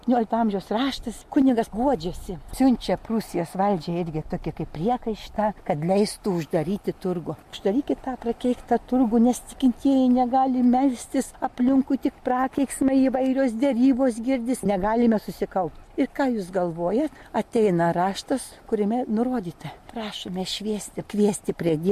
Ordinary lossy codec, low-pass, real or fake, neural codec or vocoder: MP3, 64 kbps; 14.4 kHz; real; none